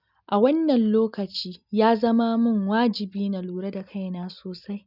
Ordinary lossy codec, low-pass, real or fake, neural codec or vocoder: none; 5.4 kHz; real; none